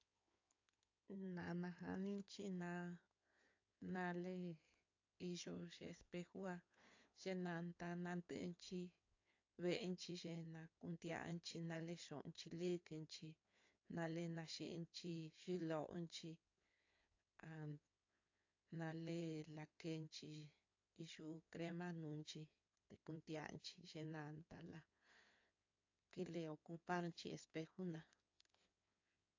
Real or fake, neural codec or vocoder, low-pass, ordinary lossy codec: fake; codec, 16 kHz in and 24 kHz out, 2.2 kbps, FireRedTTS-2 codec; 7.2 kHz; AAC, 48 kbps